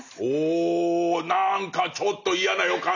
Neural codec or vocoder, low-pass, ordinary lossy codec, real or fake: none; 7.2 kHz; none; real